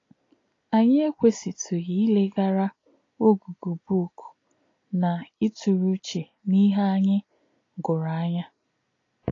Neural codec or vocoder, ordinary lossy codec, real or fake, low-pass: none; AAC, 32 kbps; real; 7.2 kHz